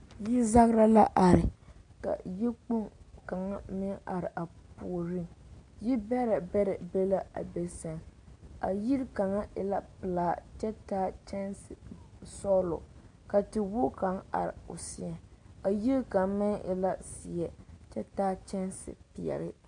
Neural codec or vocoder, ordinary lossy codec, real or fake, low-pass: none; AAC, 48 kbps; real; 9.9 kHz